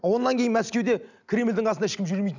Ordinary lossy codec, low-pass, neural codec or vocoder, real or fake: none; 7.2 kHz; none; real